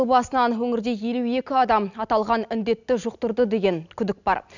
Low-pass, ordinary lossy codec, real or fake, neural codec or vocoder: 7.2 kHz; none; real; none